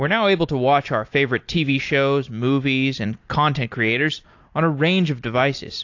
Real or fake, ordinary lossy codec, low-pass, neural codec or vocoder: real; AAC, 48 kbps; 7.2 kHz; none